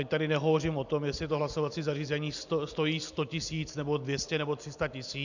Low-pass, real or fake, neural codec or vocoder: 7.2 kHz; real; none